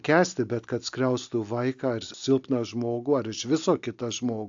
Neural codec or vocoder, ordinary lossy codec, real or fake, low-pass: none; AAC, 64 kbps; real; 7.2 kHz